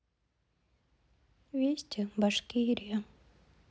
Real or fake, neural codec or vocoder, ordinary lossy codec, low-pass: real; none; none; none